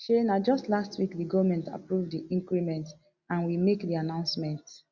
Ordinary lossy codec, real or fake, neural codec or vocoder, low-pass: Opus, 64 kbps; real; none; 7.2 kHz